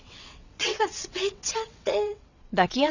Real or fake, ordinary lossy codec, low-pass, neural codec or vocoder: fake; none; 7.2 kHz; vocoder, 22.05 kHz, 80 mel bands, WaveNeXt